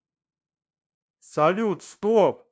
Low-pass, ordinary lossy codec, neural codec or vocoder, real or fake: none; none; codec, 16 kHz, 0.5 kbps, FunCodec, trained on LibriTTS, 25 frames a second; fake